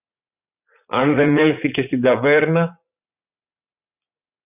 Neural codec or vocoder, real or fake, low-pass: vocoder, 44.1 kHz, 80 mel bands, Vocos; fake; 3.6 kHz